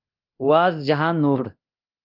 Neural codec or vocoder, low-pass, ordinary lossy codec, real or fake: codec, 24 kHz, 0.9 kbps, DualCodec; 5.4 kHz; Opus, 24 kbps; fake